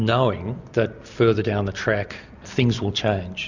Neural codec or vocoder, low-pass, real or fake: none; 7.2 kHz; real